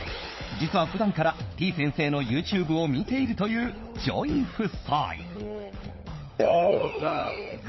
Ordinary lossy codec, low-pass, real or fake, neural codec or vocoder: MP3, 24 kbps; 7.2 kHz; fake; codec, 16 kHz, 16 kbps, FunCodec, trained on LibriTTS, 50 frames a second